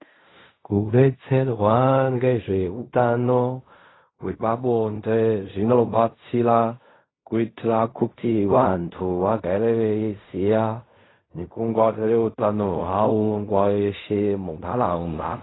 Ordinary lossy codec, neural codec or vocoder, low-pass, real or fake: AAC, 16 kbps; codec, 16 kHz in and 24 kHz out, 0.4 kbps, LongCat-Audio-Codec, fine tuned four codebook decoder; 7.2 kHz; fake